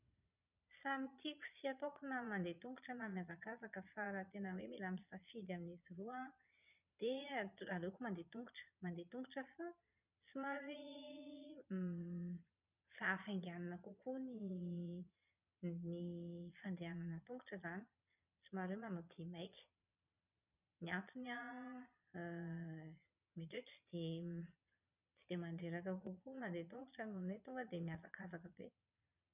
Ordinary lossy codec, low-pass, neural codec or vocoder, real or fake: none; 3.6 kHz; vocoder, 22.05 kHz, 80 mel bands, Vocos; fake